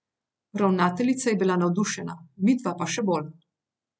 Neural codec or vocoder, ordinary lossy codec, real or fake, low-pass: none; none; real; none